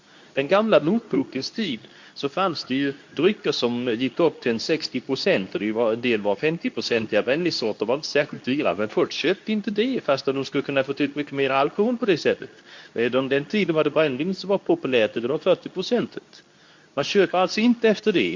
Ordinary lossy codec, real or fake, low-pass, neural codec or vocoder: MP3, 48 kbps; fake; 7.2 kHz; codec, 24 kHz, 0.9 kbps, WavTokenizer, medium speech release version 2